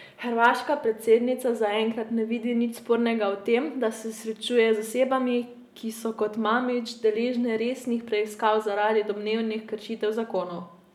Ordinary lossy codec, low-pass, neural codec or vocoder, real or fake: none; 19.8 kHz; none; real